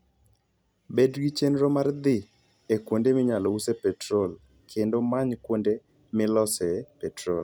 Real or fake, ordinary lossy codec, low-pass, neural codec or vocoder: real; none; none; none